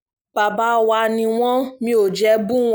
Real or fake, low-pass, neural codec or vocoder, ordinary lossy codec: real; none; none; none